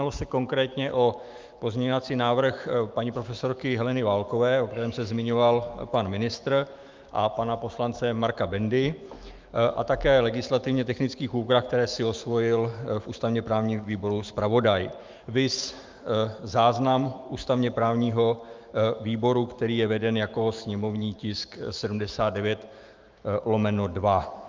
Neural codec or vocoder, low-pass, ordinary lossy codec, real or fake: autoencoder, 48 kHz, 128 numbers a frame, DAC-VAE, trained on Japanese speech; 7.2 kHz; Opus, 24 kbps; fake